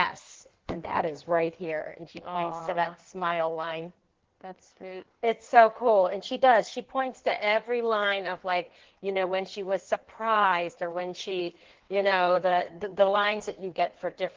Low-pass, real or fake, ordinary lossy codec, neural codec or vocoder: 7.2 kHz; fake; Opus, 16 kbps; codec, 16 kHz in and 24 kHz out, 1.1 kbps, FireRedTTS-2 codec